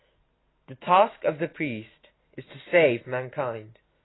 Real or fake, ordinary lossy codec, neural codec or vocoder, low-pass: real; AAC, 16 kbps; none; 7.2 kHz